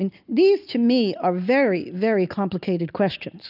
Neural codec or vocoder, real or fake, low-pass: none; real; 5.4 kHz